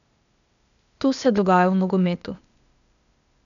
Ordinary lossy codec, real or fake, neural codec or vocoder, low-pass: none; fake; codec, 16 kHz, 0.8 kbps, ZipCodec; 7.2 kHz